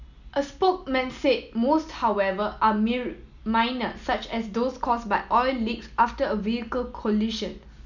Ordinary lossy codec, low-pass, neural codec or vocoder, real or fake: none; 7.2 kHz; none; real